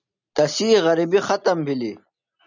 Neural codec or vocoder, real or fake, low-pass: none; real; 7.2 kHz